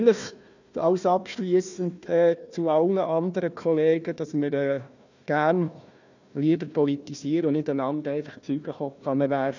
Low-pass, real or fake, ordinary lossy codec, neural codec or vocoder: 7.2 kHz; fake; none; codec, 16 kHz, 1 kbps, FunCodec, trained on Chinese and English, 50 frames a second